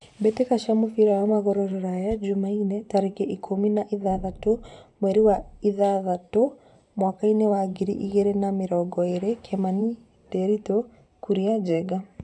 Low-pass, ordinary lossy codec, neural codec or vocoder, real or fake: 10.8 kHz; none; vocoder, 44.1 kHz, 128 mel bands every 512 samples, BigVGAN v2; fake